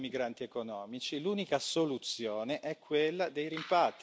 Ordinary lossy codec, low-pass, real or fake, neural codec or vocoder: none; none; real; none